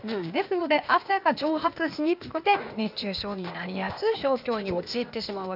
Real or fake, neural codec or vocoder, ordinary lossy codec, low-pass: fake; codec, 16 kHz, 0.8 kbps, ZipCodec; none; 5.4 kHz